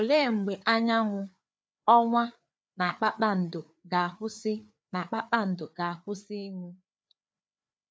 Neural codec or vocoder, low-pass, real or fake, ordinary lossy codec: codec, 16 kHz, 4 kbps, FreqCodec, larger model; none; fake; none